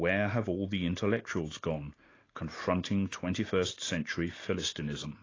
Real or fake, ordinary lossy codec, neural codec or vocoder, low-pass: real; AAC, 32 kbps; none; 7.2 kHz